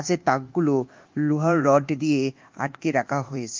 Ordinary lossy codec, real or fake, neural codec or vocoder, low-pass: Opus, 32 kbps; fake; codec, 24 kHz, 1.2 kbps, DualCodec; 7.2 kHz